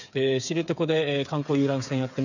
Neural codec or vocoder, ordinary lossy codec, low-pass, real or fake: codec, 16 kHz, 8 kbps, FreqCodec, smaller model; none; 7.2 kHz; fake